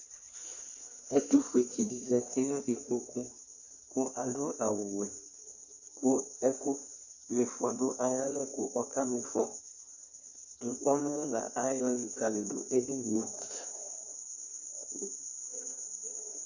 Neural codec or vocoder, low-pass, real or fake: codec, 16 kHz in and 24 kHz out, 1.1 kbps, FireRedTTS-2 codec; 7.2 kHz; fake